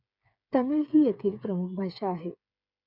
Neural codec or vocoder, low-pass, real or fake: codec, 16 kHz, 8 kbps, FreqCodec, smaller model; 5.4 kHz; fake